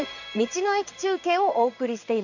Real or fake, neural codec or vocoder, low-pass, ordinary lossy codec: fake; codec, 16 kHz in and 24 kHz out, 1 kbps, XY-Tokenizer; 7.2 kHz; none